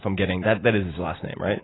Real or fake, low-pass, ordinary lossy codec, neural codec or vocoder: real; 7.2 kHz; AAC, 16 kbps; none